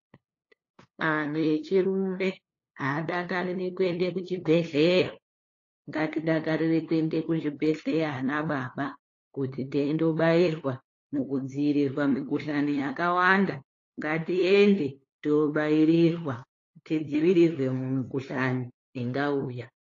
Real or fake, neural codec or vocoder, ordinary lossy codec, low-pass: fake; codec, 16 kHz, 2 kbps, FunCodec, trained on LibriTTS, 25 frames a second; AAC, 32 kbps; 7.2 kHz